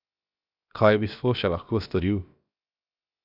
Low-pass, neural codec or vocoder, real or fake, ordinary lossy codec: 5.4 kHz; codec, 16 kHz, 0.7 kbps, FocalCodec; fake; Opus, 64 kbps